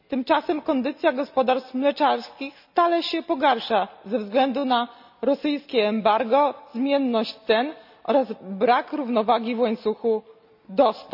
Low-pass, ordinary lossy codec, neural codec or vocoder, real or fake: 5.4 kHz; none; none; real